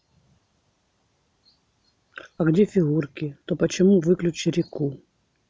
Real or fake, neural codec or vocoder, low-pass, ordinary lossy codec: real; none; none; none